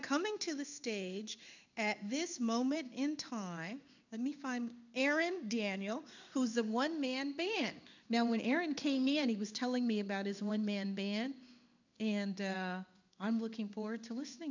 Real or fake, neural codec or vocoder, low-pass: fake; codec, 16 kHz in and 24 kHz out, 1 kbps, XY-Tokenizer; 7.2 kHz